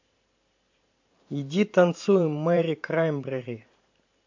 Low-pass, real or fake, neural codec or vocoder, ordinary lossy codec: 7.2 kHz; fake; vocoder, 44.1 kHz, 128 mel bands every 512 samples, BigVGAN v2; MP3, 48 kbps